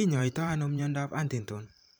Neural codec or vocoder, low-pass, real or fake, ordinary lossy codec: vocoder, 44.1 kHz, 128 mel bands every 512 samples, BigVGAN v2; none; fake; none